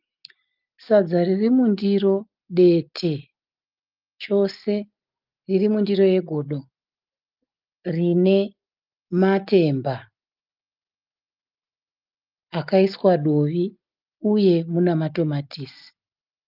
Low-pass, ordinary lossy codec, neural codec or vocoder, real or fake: 5.4 kHz; Opus, 24 kbps; none; real